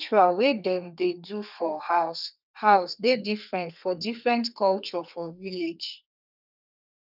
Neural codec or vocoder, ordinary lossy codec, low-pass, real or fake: codec, 32 kHz, 1.9 kbps, SNAC; none; 5.4 kHz; fake